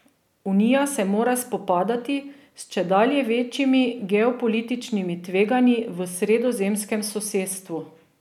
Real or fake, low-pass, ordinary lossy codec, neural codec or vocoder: real; 19.8 kHz; none; none